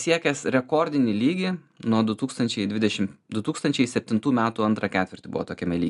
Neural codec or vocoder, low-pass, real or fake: none; 10.8 kHz; real